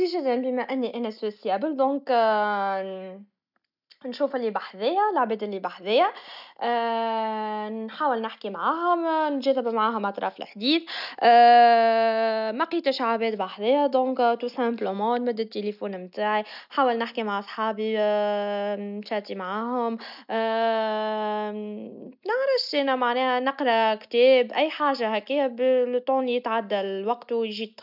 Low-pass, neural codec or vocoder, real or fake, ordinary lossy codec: 5.4 kHz; none; real; none